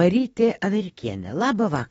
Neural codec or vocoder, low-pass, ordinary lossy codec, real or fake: autoencoder, 48 kHz, 32 numbers a frame, DAC-VAE, trained on Japanese speech; 19.8 kHz; AAC, 24 kbps; fake